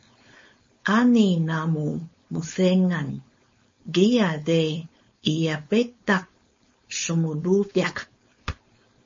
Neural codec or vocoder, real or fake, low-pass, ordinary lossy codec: codec, 16 kHz, 4.8 kbps, FACodec; fake; 7.2 kHz; MP3, 32 kbps